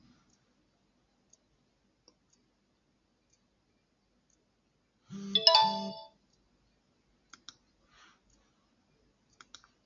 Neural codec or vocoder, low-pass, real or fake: none; 7.2 kHz; real